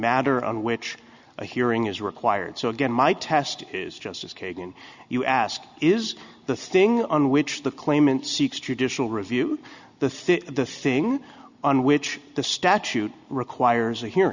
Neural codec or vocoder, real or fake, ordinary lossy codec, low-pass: none; real; Opus, 64 kbps; 7.2 kHz